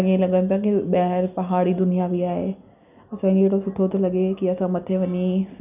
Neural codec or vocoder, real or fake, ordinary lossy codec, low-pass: none; real; none; 3.6 kHz